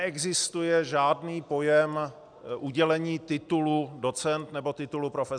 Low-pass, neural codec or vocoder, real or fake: 9.9 kHz; none; real